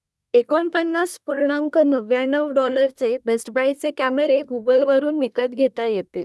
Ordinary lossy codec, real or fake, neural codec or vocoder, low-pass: none; fake; codec, 24 kHz, 1 kbps, SNAC; none